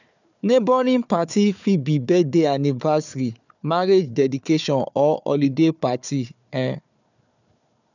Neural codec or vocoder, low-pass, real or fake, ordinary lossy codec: codec, 16 kHz, 4 kbps, FunCodec, trained on Chinese and English, 50 frames a second; 7.2 kHz; fake; none